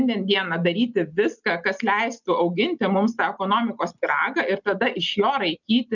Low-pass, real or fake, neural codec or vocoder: 7.2 kHz; real; none